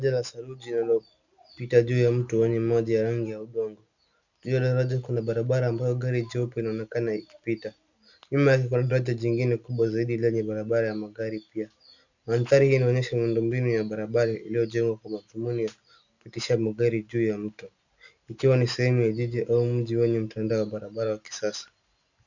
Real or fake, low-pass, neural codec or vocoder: real; 7.2 kHz; none